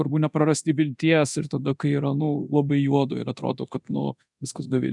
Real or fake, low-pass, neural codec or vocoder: fake; 10.8 kHz; codec, 24 kHz, 0.9 kbps, DualCodec